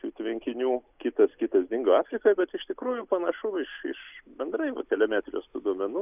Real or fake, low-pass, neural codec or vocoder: real; 3.6 kHz; none